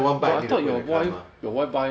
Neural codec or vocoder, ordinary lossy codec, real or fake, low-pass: none; none; real; none